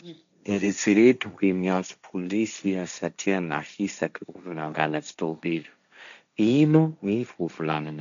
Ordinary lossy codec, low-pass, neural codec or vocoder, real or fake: MP3, 96 kbps; 7.2 kHz; codec, 16 kHz, 1.1 kbps, Voila-Tokenizer; fake